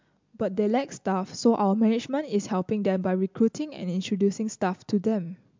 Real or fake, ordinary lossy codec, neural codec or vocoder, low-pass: real; MP3, 64 kbps; none; 7.2 kHz